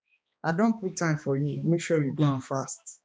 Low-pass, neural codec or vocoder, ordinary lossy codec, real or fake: none; codec, 16 kHz, 2 kbps, X-Codec, HuBERT features, trained on balanced general audio; none; fake